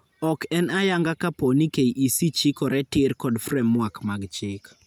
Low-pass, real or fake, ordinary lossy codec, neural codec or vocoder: none; real; none; none